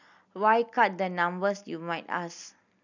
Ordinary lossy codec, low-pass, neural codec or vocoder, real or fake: none; 7.2 kHz; none; real